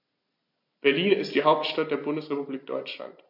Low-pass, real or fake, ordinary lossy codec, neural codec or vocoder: 5.4 kHz; real; MP3, 32 kbps; none